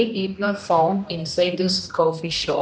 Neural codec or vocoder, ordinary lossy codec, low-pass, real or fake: codec, 16 kHz, 1 kbps, X-Codec, HuBERT features, trained on general audio; none; none; fake